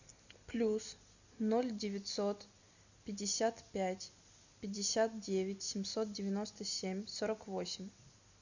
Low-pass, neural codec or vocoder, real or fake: 7.2 kHz; none; real